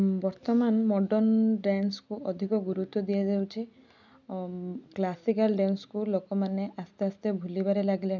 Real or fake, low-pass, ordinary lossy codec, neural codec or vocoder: real; 7.2 kHz; none; none